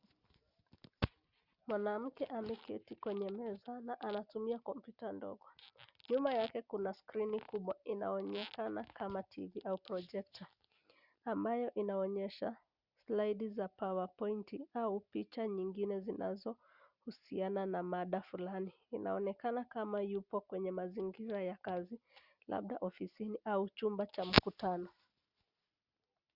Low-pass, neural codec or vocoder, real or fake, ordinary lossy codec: 5.4 kHz; none; real; Opus, 64 kbps